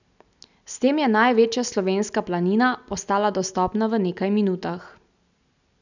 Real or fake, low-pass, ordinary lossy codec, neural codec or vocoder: real; 7.2 kHz; none; none